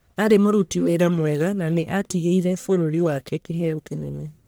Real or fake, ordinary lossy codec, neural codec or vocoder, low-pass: fake; none; codec, 44.1 kHz, 1.7 kbps, Pupu-Codec; none